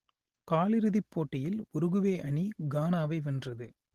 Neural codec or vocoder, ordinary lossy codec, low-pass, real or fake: none; Opus, 16 kbps; 14.4 kHz; real